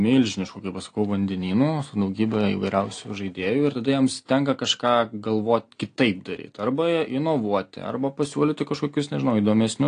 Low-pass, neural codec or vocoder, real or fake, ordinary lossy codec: 9.9 kHz; none; real; AAC, 48 kbps